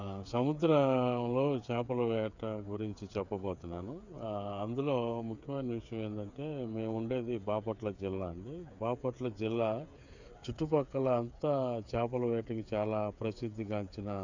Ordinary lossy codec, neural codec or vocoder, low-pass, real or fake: none; codec, 16 kHz, 8 kbps, FreqCodec, smaller model; 7.2 kHz; fake